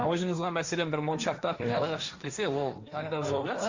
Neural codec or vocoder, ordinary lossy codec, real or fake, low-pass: codec, 16 kHz, 1.1 kbps, Voila-Tokenizer; Opus, 64 kbps; fake; 7.2 kHz